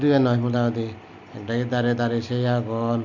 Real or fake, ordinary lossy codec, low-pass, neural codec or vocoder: real; none; 7.2 kHz; none